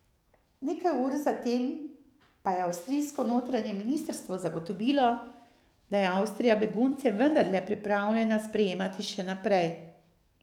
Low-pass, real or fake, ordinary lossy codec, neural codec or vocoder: 19.8 kHz; fake; none; codec, 44.1 kHz, 7.8 kbps, DAC